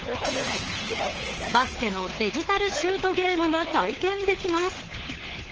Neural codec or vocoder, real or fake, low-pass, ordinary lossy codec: codec, 16 kHz, 4 kbps, FunCodec, trained on LibriTTS, 50 frames a second; fake; 7.2 kHz; Opus, 16 kbps